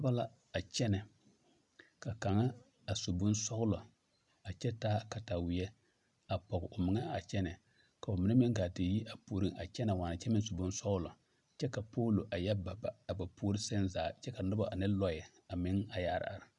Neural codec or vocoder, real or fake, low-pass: none; real; 10.8 kHz